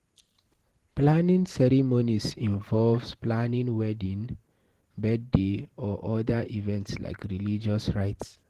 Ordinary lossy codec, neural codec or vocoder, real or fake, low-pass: Opus, 16 kbps; vocoder, 44.1 kHz, 128 mel bands every 512 samples, BigVGAN v2; fake; 14.4 kHz